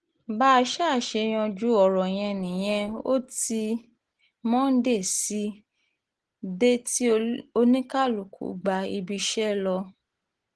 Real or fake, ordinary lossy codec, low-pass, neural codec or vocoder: real; Opus, 16 kbps; 10.8 kHz; none